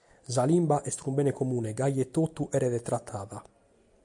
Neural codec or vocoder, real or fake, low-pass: none; real; 10.8 kHz